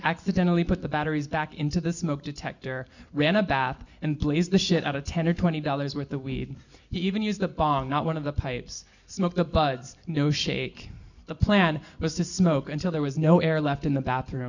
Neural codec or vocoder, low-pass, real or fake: none; 7.2 kHz; real